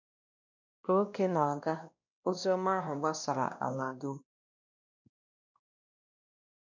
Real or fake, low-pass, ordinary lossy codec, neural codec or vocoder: fake; 7.2 kHz; none; codec, 16 kHz, 1 kbps, X-Codec, WavLM features, trained on Multilingual LibriSpeech